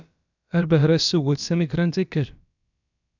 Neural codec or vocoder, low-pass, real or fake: codec, 16 kHz, about 1 kbps, DyCAST, with the encoder's durations; 7.2 kHz; fake